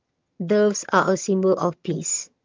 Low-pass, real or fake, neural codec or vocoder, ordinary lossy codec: 7.2 kHz; fake; vocoder, 22.05 kHz, 80 mel bands, HiFi-GAN; Opus, 24 kbps